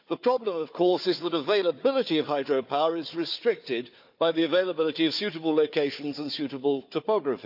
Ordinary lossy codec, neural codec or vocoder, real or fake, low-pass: none; codec, 16 kHz, 4 kbps, FunCodec, trained on Chinese and English, 50 frames a second; fake; 5.4 kHz